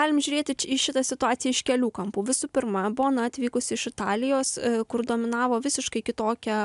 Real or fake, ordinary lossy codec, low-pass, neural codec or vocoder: real; MP3, 96 kbps; 10.8 kHz; none